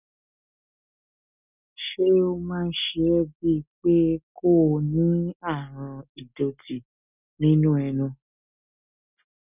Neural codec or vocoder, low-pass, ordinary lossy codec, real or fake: none; 3.6 kHz; none; real